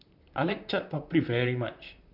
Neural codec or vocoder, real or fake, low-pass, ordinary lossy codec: vocoder, 44.1 kHz, 128 mel bands, Pupu-Vocoder; fake; 5.4 kHz; none